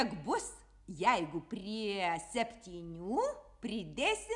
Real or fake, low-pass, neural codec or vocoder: real; 10.8 kHz; none